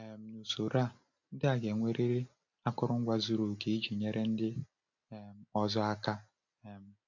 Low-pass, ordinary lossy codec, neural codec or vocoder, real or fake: 7.2 kHz; none; none; real